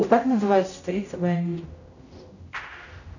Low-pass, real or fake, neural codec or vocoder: 7.2 kHz; fake; codec, 16 kHz, 0.5 kbps, X-Codec, HuBERT features, trained on general audio